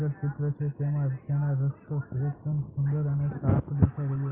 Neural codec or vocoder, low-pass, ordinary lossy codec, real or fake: none; 3.6 kHz; Opus, 24 kbps; real